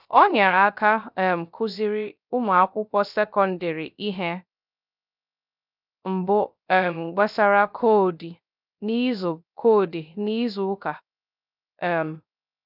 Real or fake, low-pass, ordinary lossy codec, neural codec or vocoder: fake; 5.4 kHz; none; codec, 16 kHz, 0.3 kbps, FocalCodec